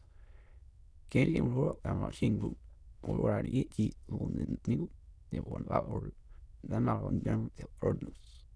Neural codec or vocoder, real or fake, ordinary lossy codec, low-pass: autoencoder, 22.05 kHz, a latent of 192 numbers a frame, VITS, trained on many speakers; fake; none; none